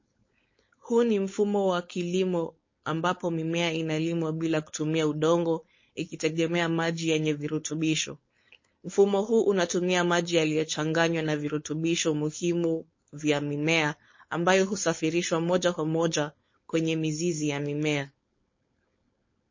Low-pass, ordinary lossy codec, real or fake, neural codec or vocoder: 7.2 kHz; MP3, 32 kbps; fake; codec, 16 kHz, 4.8 kbps, FACodec